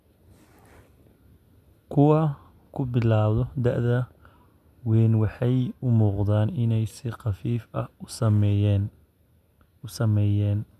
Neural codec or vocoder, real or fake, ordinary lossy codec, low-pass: none; real; none; 14.4 kHz